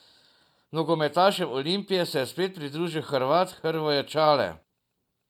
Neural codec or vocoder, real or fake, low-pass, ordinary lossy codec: none; real; 19.8 kHz; none